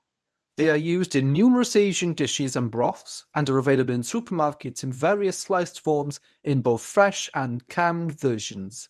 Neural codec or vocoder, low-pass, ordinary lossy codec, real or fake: codec, 24 kHz, 0.9 kbps, WavTokenizer, medium speech release version 1; none; none; fake